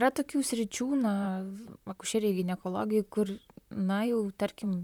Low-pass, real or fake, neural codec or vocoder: 19.8 kHz; fake; vocoder, 44.1 kHz, 128 mel bands, Pupu-Vocoder